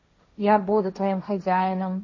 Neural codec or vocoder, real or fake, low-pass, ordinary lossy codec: codec, 16 kHz, 1.1 kbps, Voila-Tokenizer; fake; 7.2 kHz; MP3, 32 kbps